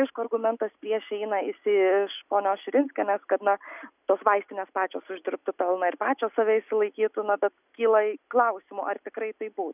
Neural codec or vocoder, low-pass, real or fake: none; 3.6 kHz; real